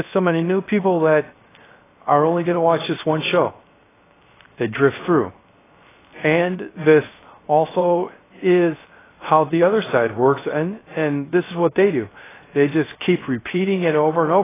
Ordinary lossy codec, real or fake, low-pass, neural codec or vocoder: AAC, 16 kbps; fake; 3.6 kHz; codec, 16 kHz, 0.3 kbps, FocalCodec